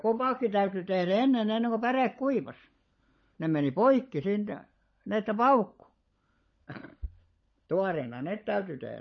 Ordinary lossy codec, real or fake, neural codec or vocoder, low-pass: MP3, 32 kbps; fake; codec, 16 kHz, 16 kbps, FunCodec, trained on LibriTTS, 50 frames a second; 7.2 kHz